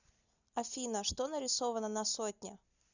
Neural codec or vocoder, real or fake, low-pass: none; real; 7.2 kHz